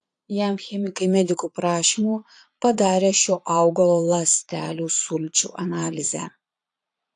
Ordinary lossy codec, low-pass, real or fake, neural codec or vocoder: AAC, 64 kbps; 9.9 kHz; fake; vocoder, 22.05 kHz, 80 mel bands, Vocos